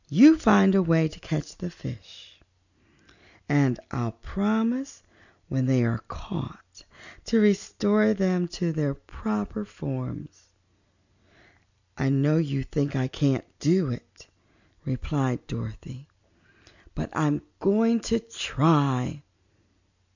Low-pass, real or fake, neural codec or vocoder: 7.2 kHz; real; none